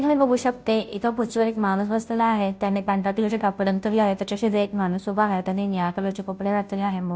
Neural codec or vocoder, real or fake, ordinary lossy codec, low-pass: codec, 16 kHz, 0.5 kbps, FunCodec, trained on Chinese and English, 25 frames a second; fake; none; none